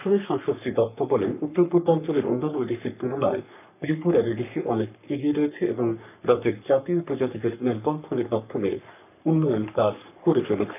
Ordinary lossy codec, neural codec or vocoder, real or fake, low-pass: none; codec, 44.1 kHz, 3.4 kbps, Pupu-Codec; fake; 3.6 kHz